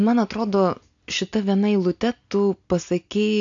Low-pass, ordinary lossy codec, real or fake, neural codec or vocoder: 7.2 kHz; AAC, 64 kbps; real; none